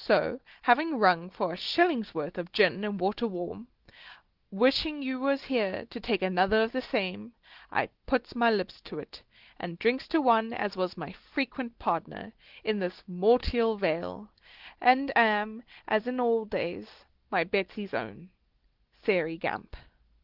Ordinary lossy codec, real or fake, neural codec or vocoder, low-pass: Opus, 16 kbps; real; none; 5.4 kHz